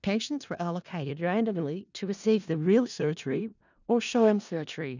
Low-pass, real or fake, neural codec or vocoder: 7.2 kHz; fake; codec, 16 kHz in and 24 kHz out, 0.4 kbps, LongCat-Audio-Codec, four codebook decoder